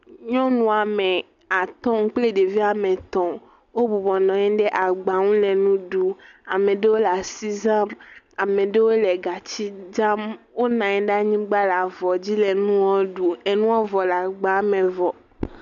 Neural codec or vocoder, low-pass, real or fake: none; 7.2 kHz; real